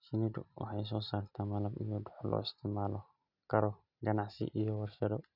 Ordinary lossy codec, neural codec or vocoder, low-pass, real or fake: none; none; 5.4 kHz; real